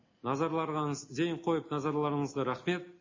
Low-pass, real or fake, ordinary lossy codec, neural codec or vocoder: 7.2 kHz; real; MP3, 32 kbps; none